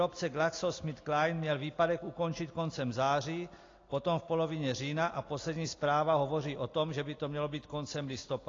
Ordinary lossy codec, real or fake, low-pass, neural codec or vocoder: AAC, 32 kbps; real; 7.2 kHz; none